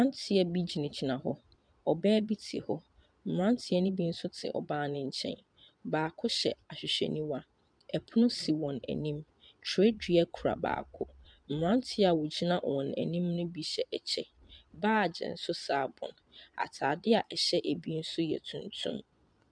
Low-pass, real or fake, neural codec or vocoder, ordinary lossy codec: 9.9 kHz; fake; vocoder, 22.05 kHz, 80 mel bands, Vocos; MP3, 96 kbps